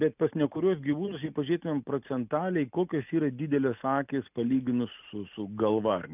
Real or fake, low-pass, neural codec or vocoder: real; 3.6 kHz; none